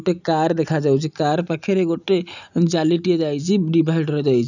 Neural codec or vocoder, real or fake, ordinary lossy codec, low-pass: none; real; none; 7.2 kHz